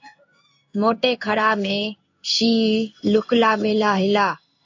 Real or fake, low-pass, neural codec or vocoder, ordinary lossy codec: fake; 7.2 kHz; codec, 16 kHz in and 24 kHz out, 1 kbps, XY-Tokenizer; AAC, 48 kbps